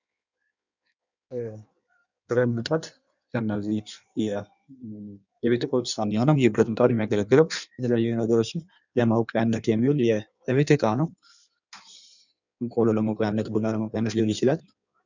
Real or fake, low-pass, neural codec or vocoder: fake; 7.2 kHz; codec, 16 kHz in and 24 kHz out, 1.1 kbps, FireRedTTS-2 codec